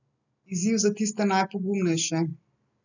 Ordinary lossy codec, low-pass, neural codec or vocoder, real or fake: none; 7.2 kHz; none; real